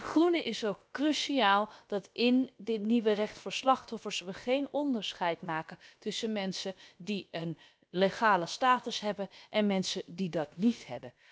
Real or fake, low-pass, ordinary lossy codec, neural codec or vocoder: fake; none; none; codec, 16 kHz, about 1 kbps, DyCAST, with the encoder's durations